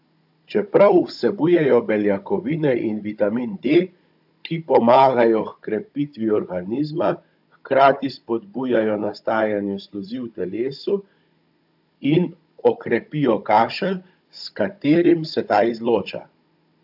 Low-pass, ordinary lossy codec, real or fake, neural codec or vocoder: 5.4 kHz; AAC, 48 kbps; fake; codec, 16 kHz, 16 kbps, FunCodec, trained on Chinese and English, 50 frames a second